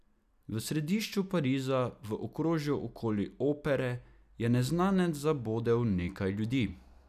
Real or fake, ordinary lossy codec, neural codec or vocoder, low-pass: real; none; none; 14.4 kHz